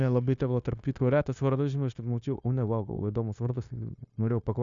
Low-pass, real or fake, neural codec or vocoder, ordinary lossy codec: 7.2 kHz; fake; codec, 16 kHz, 0.9 kbps, LongCat-Audio-Codec; Opus, 64 kbps